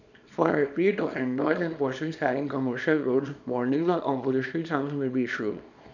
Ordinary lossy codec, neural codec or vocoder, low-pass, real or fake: none; codec, 24 kHz, 0.9 kbps, WavTokenizer, small release; 7.2 kHz; fake